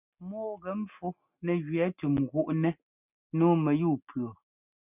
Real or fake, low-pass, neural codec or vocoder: real; 3.6 kHz; none